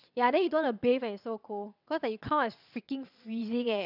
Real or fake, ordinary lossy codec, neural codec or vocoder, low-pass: fake; none; vocoder, 22.05 kHz, 80 mel bands, WaveNeXt; 5.4 kHz